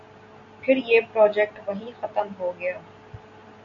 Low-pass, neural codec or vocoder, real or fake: 7.2 kHz; none; real